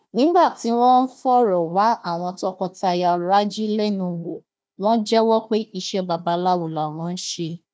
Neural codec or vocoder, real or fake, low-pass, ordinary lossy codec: codec, 16 kHz, 1 kbps, FunCodec, trained on Chinese and English, 50 frames a second; fake; none; none